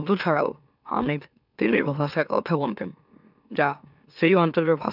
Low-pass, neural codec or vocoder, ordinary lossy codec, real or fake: 5.4 kHz; autoencoder, 44.1 kHz, a latent of 192 numbers a frame, MeloTTS; none; fake